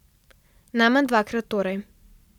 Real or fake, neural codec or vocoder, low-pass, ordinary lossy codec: fake; vocoder, 44.1 kHz, 128 mel bands every 512 samples, BigVGAN v2; 19.8 kHz; none